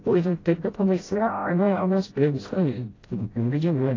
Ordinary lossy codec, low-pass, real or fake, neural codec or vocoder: AAC, 32 kbps; 7.2 kHz; fake; codec, 16 kHz, 0.5 kbps, FreqCodec, smaller model